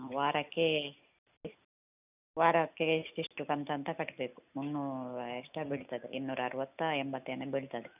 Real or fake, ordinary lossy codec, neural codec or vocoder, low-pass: real; none; none; 3.6 kHz